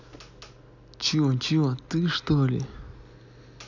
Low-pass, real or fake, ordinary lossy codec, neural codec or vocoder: 7.2 kHz; real; none; none